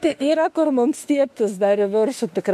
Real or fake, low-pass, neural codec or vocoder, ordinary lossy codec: fake; 14.4 kHz; autoencoder, 48 kHz, 32 numbers a frame, DAC-VAE, trained on Japanese speech; MP3, 64 kbps